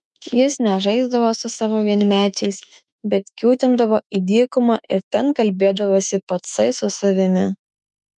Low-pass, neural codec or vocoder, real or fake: 10.8 kHz; autoencoder, 48 kHz, 32 numbers a frame, DAC-VAE, trained on Japanese speech; fake